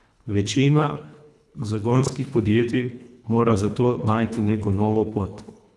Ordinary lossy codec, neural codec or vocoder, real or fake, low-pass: none; codec, 24 kHz, 1.5 kbps, HILCodec; fake; none